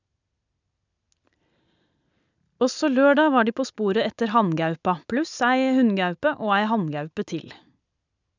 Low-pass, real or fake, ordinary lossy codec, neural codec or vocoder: 7.2 kHz; real; none; none